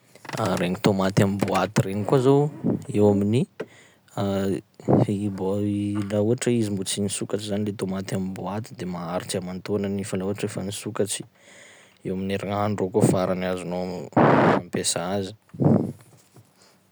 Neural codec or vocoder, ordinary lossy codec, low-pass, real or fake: none; none; none; real